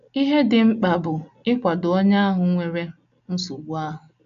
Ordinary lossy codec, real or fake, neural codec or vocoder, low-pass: AAC, 96 kbps; real; none; 7.2 kHz